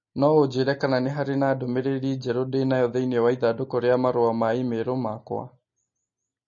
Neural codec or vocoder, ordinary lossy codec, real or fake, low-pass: none; MP3, 32 kbps; real; 7.2 kHz